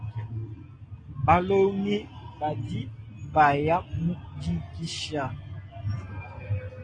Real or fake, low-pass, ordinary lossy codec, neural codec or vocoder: real; 9.9 kHz; AAC, 32 kbps; none